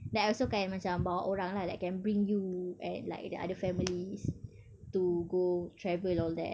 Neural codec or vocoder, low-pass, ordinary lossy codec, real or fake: none; none; none; real